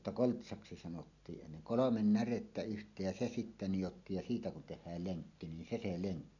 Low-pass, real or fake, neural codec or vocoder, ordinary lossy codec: 7.2 kHz; real; none; none